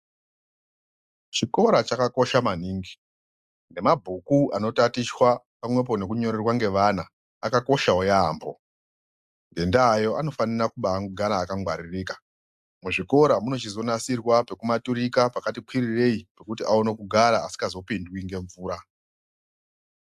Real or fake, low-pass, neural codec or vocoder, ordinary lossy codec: real; 14.4 kHz; none; AAC, 96 kbps